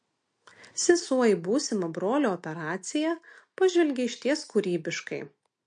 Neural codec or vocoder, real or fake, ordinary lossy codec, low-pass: none; real; MP3, 48 kbps; 9.9 kHz